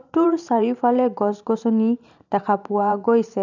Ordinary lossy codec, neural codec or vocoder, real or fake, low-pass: none; vocoder, 44.1 kHz, 128 mel bands every 512 samples, BigVGAN v2; fake; 7.2 kHz